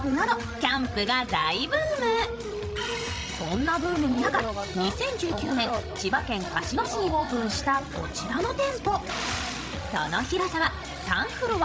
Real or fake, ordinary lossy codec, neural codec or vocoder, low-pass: fake; none; codec, 16 kHz, 16 kbps, FreqCodec, larger model; none